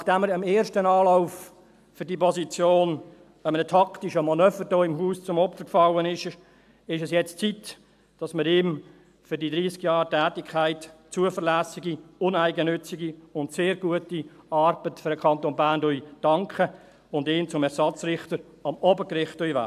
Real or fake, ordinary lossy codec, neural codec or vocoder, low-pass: real; AAC, 96 kbps; none; 14.4 kHz